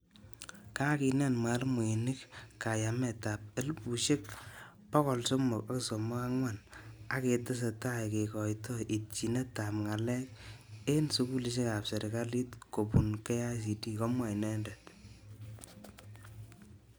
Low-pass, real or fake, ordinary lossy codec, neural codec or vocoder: none; real; none; none